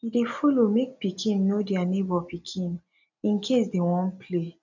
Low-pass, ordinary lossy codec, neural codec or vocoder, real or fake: 7.2 kHz; none; none; real